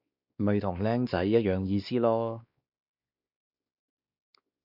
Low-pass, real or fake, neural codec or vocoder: 5.4 kHz; fake; codec, 16 kHz, 2 kbps, X-Codec, WavLM features, trained on Multilingual LibriSpeech